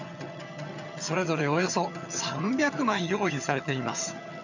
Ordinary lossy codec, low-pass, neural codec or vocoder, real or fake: none; 7.2 kHz; vocoder, 22.05 kHz, 80 mel bands, HiFi-GAN; fake